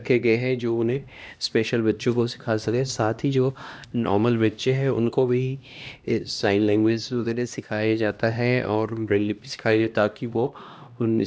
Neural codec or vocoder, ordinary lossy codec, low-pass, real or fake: codec, 16 kHz, 1 kbps, X-Codec, HuBERT features, trained on LibriSpeech; none; none; fake